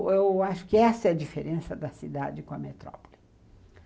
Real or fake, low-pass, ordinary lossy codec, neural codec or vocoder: real; none; none; none